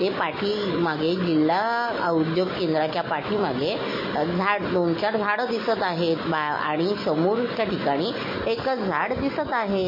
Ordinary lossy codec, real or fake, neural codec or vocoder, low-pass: MP3, 24 kbps; real; none; 5.4 kHz